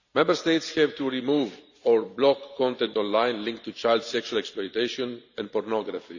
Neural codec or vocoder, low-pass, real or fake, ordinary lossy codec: vocoder, 44.1 kHz, 128 mel bands every 512 samples, BigVGAN v2; 7.2 kHz; fake; none